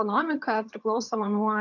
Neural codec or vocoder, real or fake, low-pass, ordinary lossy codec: vocoder, 22.05 kHz, 80 mel bands, HiFi-GAN; fake; 7.2 kHz; AAC, 48 kbps